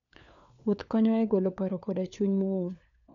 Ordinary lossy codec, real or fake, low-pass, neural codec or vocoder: none; fake; 7.2 kHz; codec, 16 kHz, 4 kbps, FunCodec, trained on LibriTTS, 50 frames a second